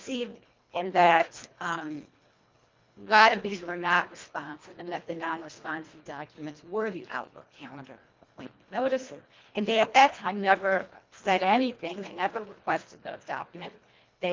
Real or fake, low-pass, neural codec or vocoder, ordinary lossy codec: fake; 7.2 kHz; codec, 24 kHz, 1.5 kbps, HILCodec; Opus, 24 kbps